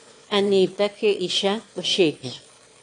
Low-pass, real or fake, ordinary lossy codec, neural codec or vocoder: 9.9 kHz; fake; AAC, 48 kbps; autoencoder, 22.05 kHz, a latent of 192 numbers a frame, VITS, trained on one speaker